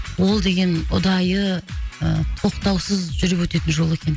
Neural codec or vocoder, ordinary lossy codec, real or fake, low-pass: none; none; real; none